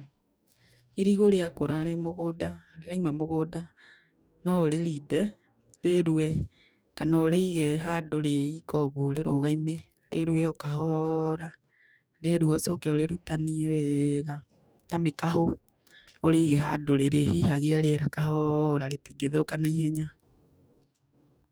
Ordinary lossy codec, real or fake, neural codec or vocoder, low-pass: none; fake; codec, 44.1 kHz, 2.6 kbps, DAC; none